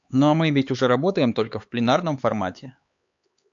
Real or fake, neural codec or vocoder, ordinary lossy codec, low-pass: fake; codec, 16 kHz, 4 kbps, X-Codec, HuBERT features, trained on LibriSpeech; AAC, 64 kbps; 7.2 kHz